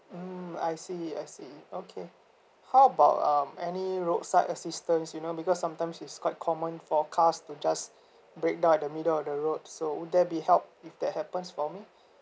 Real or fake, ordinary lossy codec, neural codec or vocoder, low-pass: real; none; none; none